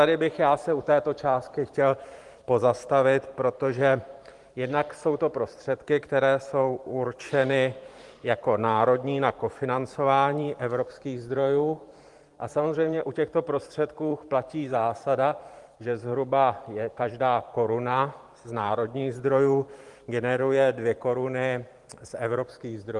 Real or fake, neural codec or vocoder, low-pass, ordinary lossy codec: fake; vocoder, 44.1 kHz, 128 mel bands every 512 samples, BigVGAN v2; 10.8 kHz; Opus, 24 kbps